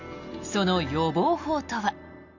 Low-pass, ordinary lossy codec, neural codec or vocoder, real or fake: 7.2 kHz; none; none; real